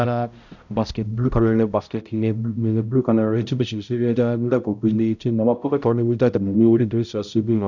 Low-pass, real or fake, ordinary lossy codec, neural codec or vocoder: 7.2 kHz; fake; none; codec, 16 kHz, 0.5 kbps, X-Codec, HuBERT features, trained on balanced general audio